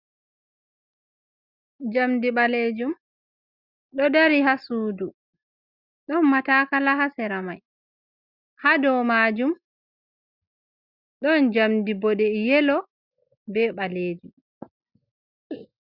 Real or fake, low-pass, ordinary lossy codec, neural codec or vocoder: real; 5.4 kHz; Opus, 64 kbps; none